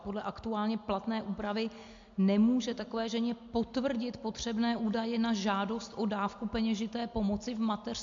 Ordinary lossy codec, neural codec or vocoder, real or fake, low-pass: MP3, 48 kbps; none; real; 7.2 kHz